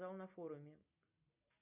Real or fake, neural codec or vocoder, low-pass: real; none; 3.6 kHz